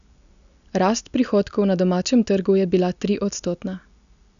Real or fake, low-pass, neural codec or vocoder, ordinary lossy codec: real; 7.2 kHz; none; none